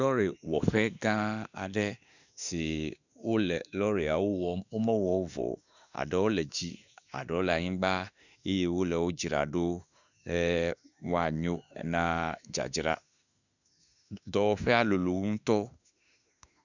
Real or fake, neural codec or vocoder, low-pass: fake; autoencoder, 48 kHz, 32 numbers a frame, DAC-VAE, trained on Japanese speech; 7.2 kHz